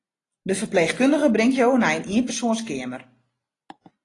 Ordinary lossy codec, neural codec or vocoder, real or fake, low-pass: AAC, 32 kbps; none; real; 10.8 kHz